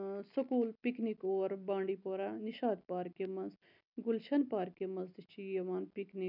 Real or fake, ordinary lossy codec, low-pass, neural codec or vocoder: real; none; 5.4 kHz; none